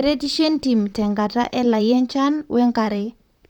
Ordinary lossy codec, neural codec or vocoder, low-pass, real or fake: none; vocoder, 44.1 kHz, 128 mel bands, Pupu-Vocoder; 19.8 kHz; fake